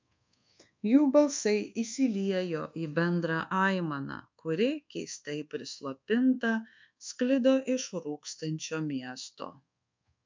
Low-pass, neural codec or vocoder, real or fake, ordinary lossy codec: 7.2 kHz; codec, 24 kHz, 1.2 kbps, DualCodec; fake; MP3, 64 kbps